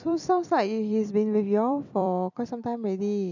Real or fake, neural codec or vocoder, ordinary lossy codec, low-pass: fake; vocoder, 44.1 kHz, 128 mel bands every 256 samples, BigVGAN v2; none; 7.2 kHz